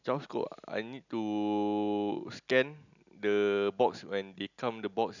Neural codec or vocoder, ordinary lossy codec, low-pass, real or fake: none; none; 7.2 kHz; real